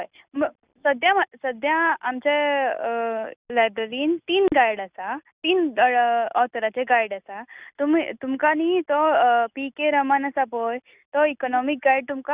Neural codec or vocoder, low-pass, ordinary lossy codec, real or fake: none; 3.6 kHz; Opus, 64 kbps; real